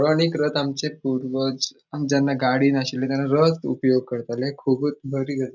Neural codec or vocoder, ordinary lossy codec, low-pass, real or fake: none; none; 7.2 kHz; real